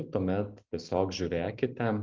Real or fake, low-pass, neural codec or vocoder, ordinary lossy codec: real; 7.2 kHz; none; Opus, 24 kbps